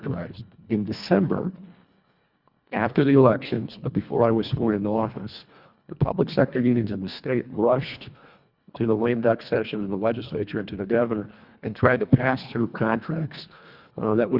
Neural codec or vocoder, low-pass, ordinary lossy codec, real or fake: codec, 24 kHz, 1.5 kbps, HILCodec; 5.4 kHz; Opus, 64 kbps; fake